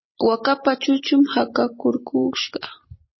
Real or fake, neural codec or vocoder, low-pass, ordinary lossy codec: fake; vocoder, 44.1 kHz, 128 mel bands every 256 samples, BigVGAN v2; 7.2 kHz; MP3, 24 kbps